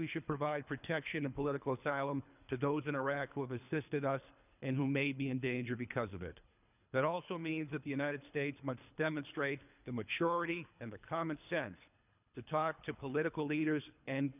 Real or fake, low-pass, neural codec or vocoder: fake; 3.6 kHz; codec, 24 kHz, 3 kbps, HILCodec